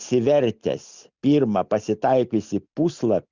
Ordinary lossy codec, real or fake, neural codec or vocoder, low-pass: Opus, 64 kbps; real; none; 7.2 kHz